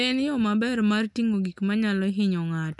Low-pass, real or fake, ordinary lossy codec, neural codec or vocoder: 10.8 kHz; real; none; none